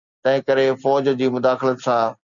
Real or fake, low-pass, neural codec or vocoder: real; 7.2 kHz; none